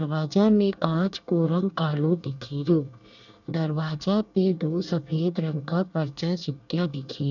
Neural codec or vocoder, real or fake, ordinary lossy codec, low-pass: codec, 24 kHz, 1 kbps, SNAC; fake; none; 7.2 kHz